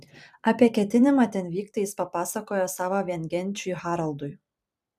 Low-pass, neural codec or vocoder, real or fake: 14.4 kHz; vocoder, 44.1 kHz, 128 mel bands every 256 samples, BigVGAN v2; fake